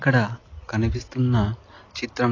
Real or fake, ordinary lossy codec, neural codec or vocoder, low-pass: real; AAC, 32 kbps; none; 7.2 kHz